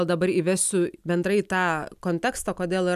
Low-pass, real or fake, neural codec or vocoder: 14.4 kHz; real; none